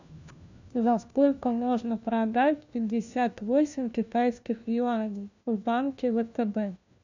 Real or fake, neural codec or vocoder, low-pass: fake; codec, 16 kHz, 1 kbps, FunCodec, trained on LibriTTS, 50 frames a second; 7.2 kHz